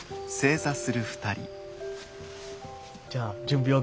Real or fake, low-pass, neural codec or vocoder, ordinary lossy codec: real; none; none; none